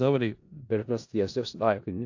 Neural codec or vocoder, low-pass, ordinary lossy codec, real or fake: codec, 16 kHz in and 24 kHz out, 0.4 kbps, LongCat-Audio-Codec, four codebook decoder; 7.2 kHz; AAC, 48 kbps; fake